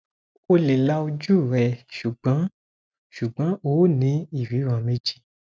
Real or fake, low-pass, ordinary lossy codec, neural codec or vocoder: real; none; none; none